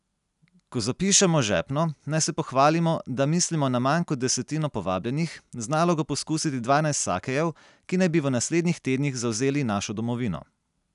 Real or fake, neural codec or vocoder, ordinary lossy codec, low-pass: real; none; none; 10.8 kHz